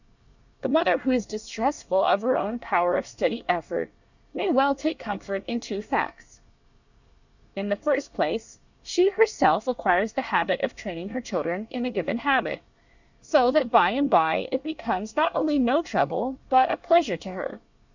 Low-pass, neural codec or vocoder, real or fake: 7.2 kHz; codec, 24 kHz, 1 kbps, SNAC; fake